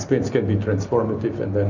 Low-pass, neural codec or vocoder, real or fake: 7.2 kHz; none; real